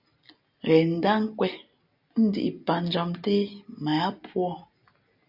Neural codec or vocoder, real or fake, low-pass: none; real; 5.4 kHz